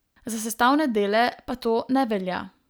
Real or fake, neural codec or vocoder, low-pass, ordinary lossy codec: real; none; none; none